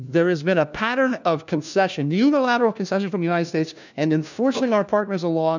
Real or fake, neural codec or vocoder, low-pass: fake; codec, 16 kHz, 1 kbps, FunCodec, trained on LibriTTS, 50 frames a second; 7.2 kHz